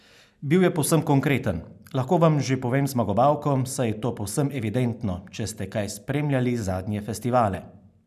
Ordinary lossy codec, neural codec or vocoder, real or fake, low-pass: none; none; real; 14.4 kHz